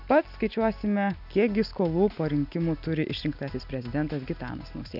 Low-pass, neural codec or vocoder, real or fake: 5.4 kHz; none; real